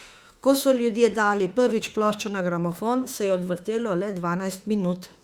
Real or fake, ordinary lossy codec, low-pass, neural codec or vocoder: fake; Opus, 64 kbps; 19.8 kHz; autoencoder, 48 kHz, 32 numbers a frame, DAC-VAE, trained on Japanese speech